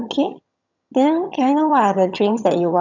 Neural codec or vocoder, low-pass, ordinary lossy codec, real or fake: vocoder, 22.05 kHz, 80 mel bands, HiFi-GAN; 7.2 kHz; none; fake